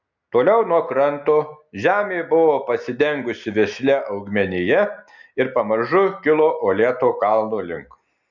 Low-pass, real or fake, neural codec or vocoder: 7.2 kHz; real; none